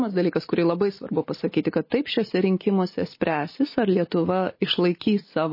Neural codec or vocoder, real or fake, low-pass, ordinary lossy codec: none; real; 5.4 kHz; MP3, 24 kbps